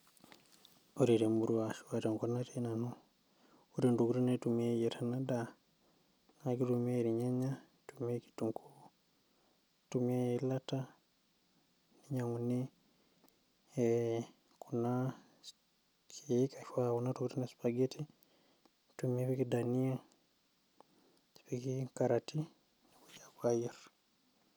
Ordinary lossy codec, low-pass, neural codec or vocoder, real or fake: none; none; none; real